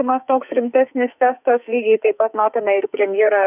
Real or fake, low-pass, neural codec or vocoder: fake; 3.6 kHz; codec, 44.1 kHz, 2.6 kbps, SNAC